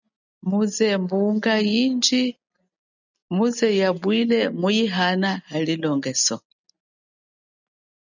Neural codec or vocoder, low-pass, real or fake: none; 7.2 kHz; real